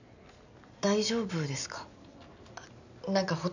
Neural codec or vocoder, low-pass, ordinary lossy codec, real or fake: none; 7.2 kHz; none; real